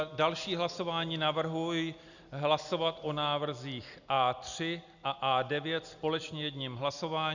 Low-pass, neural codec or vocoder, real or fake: 7.2 kHz; none; real